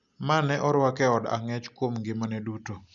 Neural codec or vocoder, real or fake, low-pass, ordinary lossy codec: none; real; 7.2 kHz; MP3, 96 kbps